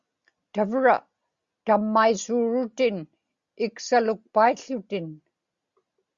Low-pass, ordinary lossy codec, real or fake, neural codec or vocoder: 7.2 kHz; Opus, 64 kbps; real; none